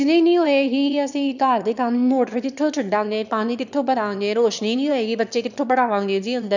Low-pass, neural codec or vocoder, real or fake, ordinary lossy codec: 7.2 kHz; autoencoder, 22.05 kHz, a latent of 192 numbers a frame, VITS, trained on one speaker; fake; none